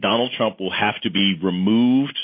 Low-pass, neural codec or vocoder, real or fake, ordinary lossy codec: 5.4 kHz; none; real; MP3, 24 kbps